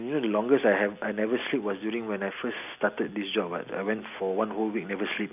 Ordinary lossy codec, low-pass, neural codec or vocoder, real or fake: none; 3.6 kHz; none; real